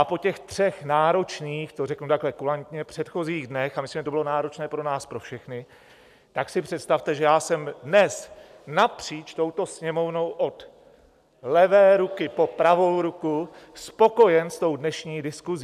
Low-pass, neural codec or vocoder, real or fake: 14.4 kHz; none; real